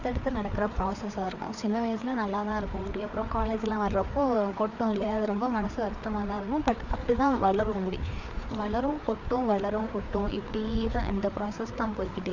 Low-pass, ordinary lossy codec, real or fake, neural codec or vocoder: 7.2 kHz; none; fake; codec, 16 kHz, 4 kbps, FreqCodec, larger model